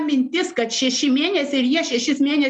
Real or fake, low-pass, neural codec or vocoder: real; 10.8 kHz; none